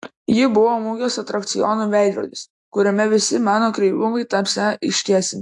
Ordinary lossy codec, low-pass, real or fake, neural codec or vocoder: AAC, 64 kbps; 10.8 kHz; real; none